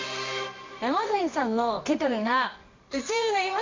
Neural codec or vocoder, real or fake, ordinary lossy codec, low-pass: codec, 24 kHz, 0.9 kbps, WavTokenizer, medium music audio release; fake; MP3, 48 kbps; 7.2 kHz